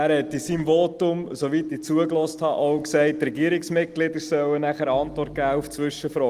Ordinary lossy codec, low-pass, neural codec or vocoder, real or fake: Opus, 24 kbps; 14.4 kHz; none; real